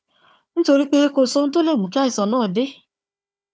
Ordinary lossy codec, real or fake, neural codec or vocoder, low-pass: none; fake; codec, 16 kHz, 4 kbps, FunCodec, trained on Chinese and English, 50 frames a second; none